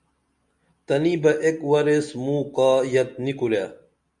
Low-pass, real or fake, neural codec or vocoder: 10.8 kHz; real; none